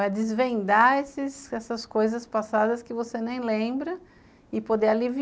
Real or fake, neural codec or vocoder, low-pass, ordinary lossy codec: real; none; none; none